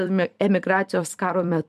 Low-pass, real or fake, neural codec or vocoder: 14.4 kHz; fake; vocoder, 44.1 kHz, 128 mel bands every 256 samples, BigVGAN v2